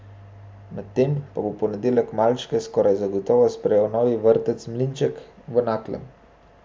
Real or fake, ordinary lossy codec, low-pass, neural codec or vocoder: real; none; none; none